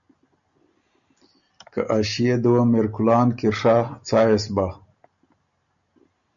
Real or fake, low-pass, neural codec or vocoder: real; 7.2 kHz; none